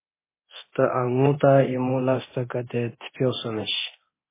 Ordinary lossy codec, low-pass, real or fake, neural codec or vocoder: MP3, 16 kbps; 3.6 kHz; fake; codec, 24 kHz, 0.9 kbps, DualCodec